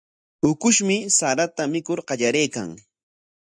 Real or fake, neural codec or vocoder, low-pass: real; none; 9.9 kHz